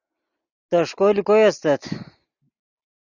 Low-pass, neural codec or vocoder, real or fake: 7.2 kHz; none; real